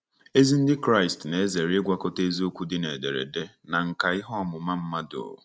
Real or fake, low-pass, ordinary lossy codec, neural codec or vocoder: real; none; none; none